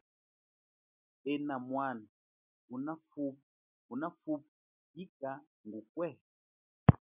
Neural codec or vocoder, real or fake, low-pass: none; real; 3.6 kHz